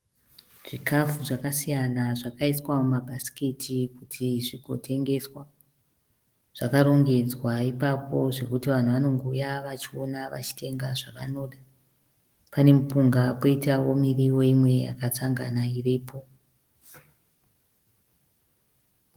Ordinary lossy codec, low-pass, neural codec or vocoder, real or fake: Opus, 24 kbps; 19.8 kHz; codec, 44.1 kHz, 7.8 kbps, DAC; fake